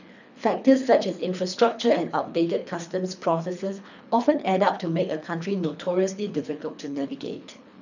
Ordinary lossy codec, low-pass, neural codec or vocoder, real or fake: none; 7.2 kHz; codec, 24 kHz, 3 kbps, HILCodec; fake